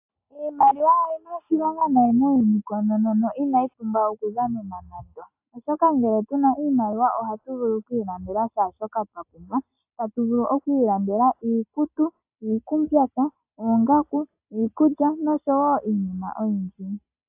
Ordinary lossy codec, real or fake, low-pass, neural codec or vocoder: AAC, 32 kbps; real; 3.6 kHz; none